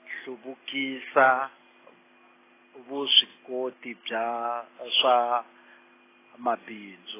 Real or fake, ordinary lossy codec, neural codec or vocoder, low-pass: real; AAC, 16 kbps; none; 3.6 kHz